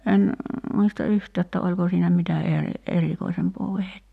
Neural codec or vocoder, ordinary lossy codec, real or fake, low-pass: none; none; real; 14.4 kHz